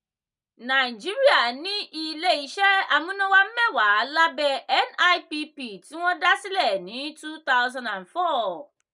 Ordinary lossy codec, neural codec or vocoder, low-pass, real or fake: none; none; 10.8 kHz; real